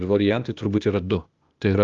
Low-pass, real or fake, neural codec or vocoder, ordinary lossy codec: 7.2 kHz; fake; codec, 16 kHz, 0.8 kbps, ZipCodec; Opus, 32 kbps